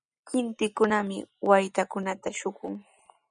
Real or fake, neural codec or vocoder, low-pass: real; none; 9.9 kHz